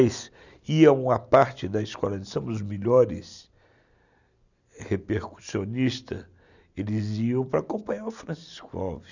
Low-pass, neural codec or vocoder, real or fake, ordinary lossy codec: 7.2 kHz; none; real; none